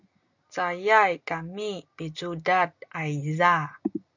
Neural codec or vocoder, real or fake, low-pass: none; real; 7.2 kHz